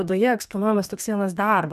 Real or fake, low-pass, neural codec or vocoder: fake; 14.4 kHz; codec, 32 kHz, 1.9 kbps, SNAC